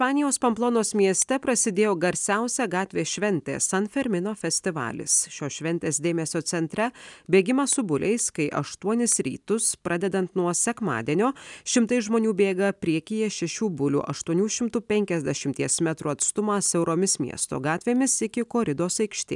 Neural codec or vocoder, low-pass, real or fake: none; 10.8 kHz; real